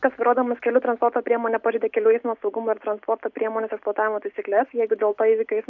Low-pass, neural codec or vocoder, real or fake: 7.2 kHz; none; real